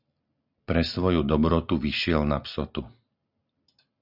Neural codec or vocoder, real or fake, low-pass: none; real; 5.4 kHz